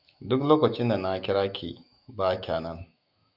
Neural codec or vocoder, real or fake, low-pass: autoencoder, 48 kHz, 128 numbers a frame, DAC-VAE, trained on Japanese speech; fake; 5.4 kHz